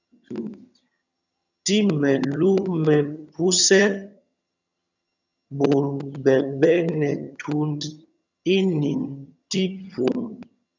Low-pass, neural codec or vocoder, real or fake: 7.2 kHz; vocoder, 22.05 kHz, 80 mel bands, HiFi-GAN; fake